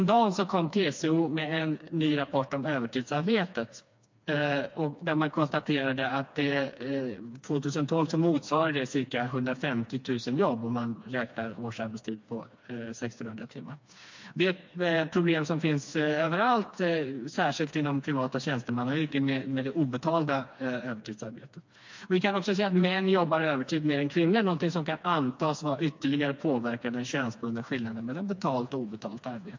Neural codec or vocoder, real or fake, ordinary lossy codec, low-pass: codec, 16 kHz, 2 kbps, FreqCodec, smaller model; fake; MP3, 48 kbps; 7.2 kHz